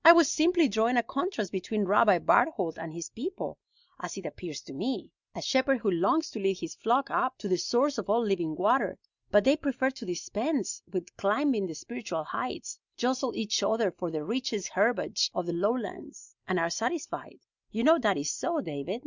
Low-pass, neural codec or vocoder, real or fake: 7.2 kHz; none; real